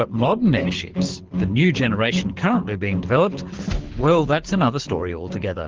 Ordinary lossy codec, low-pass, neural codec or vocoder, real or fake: Opus, 16 kbps; 7.2 kHz; codec, 24 kHz, 6 kbps, HILCodec; fake